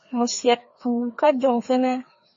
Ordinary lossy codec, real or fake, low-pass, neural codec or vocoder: MP3, 32 kbps; fake; 7.2 kHz; codec, 16 kHz, 2 kbps, FreqCodec, larger model